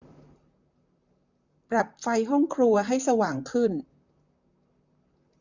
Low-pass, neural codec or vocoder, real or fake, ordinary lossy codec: 7.2 kHz; vocoder, 44.1 kHz, 128 mel bands, Pupu-Vocoder; fake; none